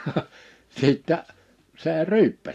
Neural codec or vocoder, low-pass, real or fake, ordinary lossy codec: none; 14.4 kHz; real; AAC, 64 kbps